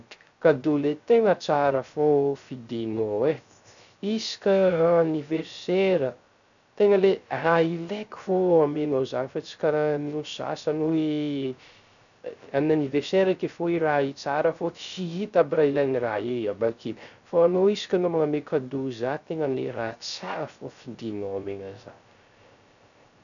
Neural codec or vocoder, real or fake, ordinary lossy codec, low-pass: codec, 16 kHz, 0.3 kbps, FocalCodec; fake; none; 7.2 kHz